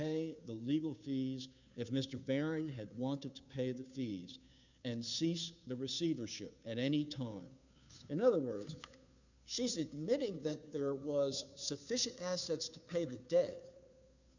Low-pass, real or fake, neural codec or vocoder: 7.2 kHz; fake; codec, 16 kHz, 2 kbps, FunCodec, trained on Chinese and English, 25 frames a second